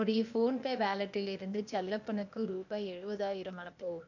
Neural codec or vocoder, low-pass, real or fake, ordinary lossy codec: codec, 16 kHz, 0.8 kbps, ZipCodec; 7.2 kHz; fake; none